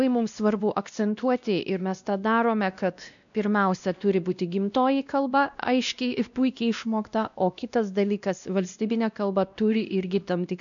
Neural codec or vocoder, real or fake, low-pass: codec, 16 kHz, 1 kbps, X-Codec, WavLM features, trained on Multilingual LibriSpeech; fake; 7.2 kHz